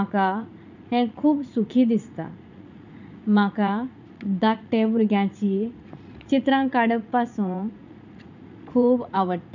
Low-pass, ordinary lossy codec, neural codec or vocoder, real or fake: 7.2 kHz; none; vocoder, 44.1 kHz, 80 mel bands, Vocos; fake